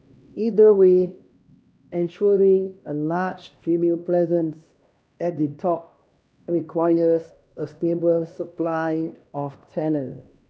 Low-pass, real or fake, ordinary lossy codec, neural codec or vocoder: none; fake; none; codec, 16 kHz, 1 kbps, X-Codec, HuBERT features, trained on LibriSpeech